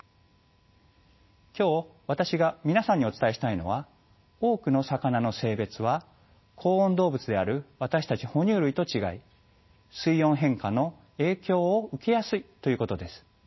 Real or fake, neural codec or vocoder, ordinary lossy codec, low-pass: real; none; MP3, 24 kbps; 7.2 kHz